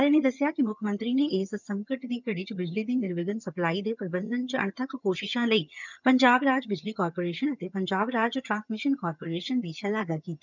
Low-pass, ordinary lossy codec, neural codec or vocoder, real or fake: 7.2 kHz; none; vocoder, 22.05 kHz, 80 mel bands, HiFi-GAN; fake